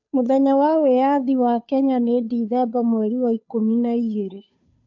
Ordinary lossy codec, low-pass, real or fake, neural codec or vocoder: AAC, 48 kbps; 7.2 kHz; fake; codec, 16 kHz, 2 kbps, FunCodec, trained on Chinese and English, 25 frames a second